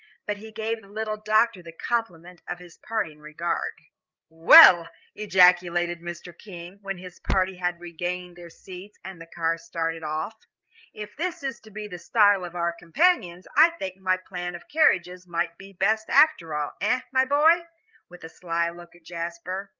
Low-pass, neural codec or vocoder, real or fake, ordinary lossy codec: 7.2 kHz; codec, 16 kHz, 8 kbps, FreqCodec, larger model; fake; Opus, 24 kbps